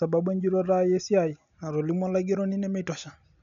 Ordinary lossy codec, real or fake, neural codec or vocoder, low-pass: none; real; none; 7.2 kHz